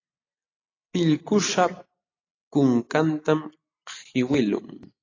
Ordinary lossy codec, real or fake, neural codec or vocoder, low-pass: AAC, 32 kbps; real; none; 7.2 kHz